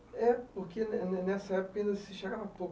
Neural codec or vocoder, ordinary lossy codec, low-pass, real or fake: none; none; none; real